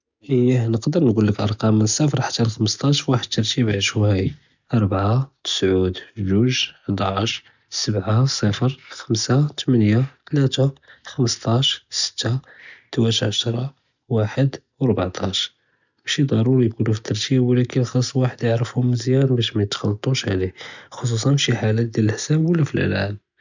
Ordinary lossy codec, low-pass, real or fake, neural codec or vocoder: none; 7.2 kHz; real; none